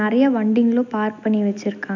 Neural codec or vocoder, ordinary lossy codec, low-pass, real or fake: none; none; 7.2 kHz; real